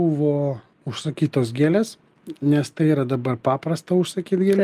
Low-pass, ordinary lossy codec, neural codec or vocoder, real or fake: 14.4 kHz; Opus, 32 kbps; none; real